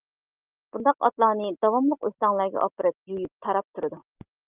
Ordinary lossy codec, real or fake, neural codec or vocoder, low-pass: Opus, 24 kbps; real; none; 3.6 kHz